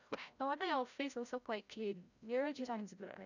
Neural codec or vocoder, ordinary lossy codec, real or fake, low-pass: codec, 16 kHz, 0.5 kbps, FreqCodec, larger model; none; fake; 7.2 kHz